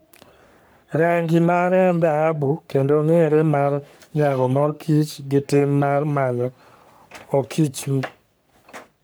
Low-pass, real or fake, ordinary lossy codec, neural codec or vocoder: none; fake; none; codec, 44.1 kHz, 3.4 kbps, Pupu-Codec